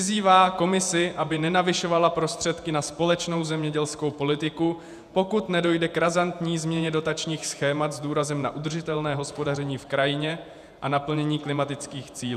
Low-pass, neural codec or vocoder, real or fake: 14.4 kHz; vocoder, 48 kHz, 128 mel bands, Vocos; fake